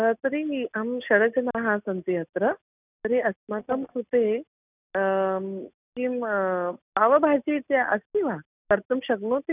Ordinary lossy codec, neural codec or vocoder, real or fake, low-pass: none; none; real; 3.6 kHz